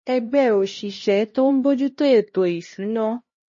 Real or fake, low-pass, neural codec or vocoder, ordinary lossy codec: fake; 7.2 kHz; codec, 16 kHz, 1 kbps, X-Codec, HuBERT features, trained on LibriSpeech; MP3, 32 kbps